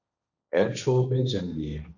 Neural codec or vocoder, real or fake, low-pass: codec, 16 kHz, 1.1 kbps, Voila-Tokenizer; fake; 7.2 kHz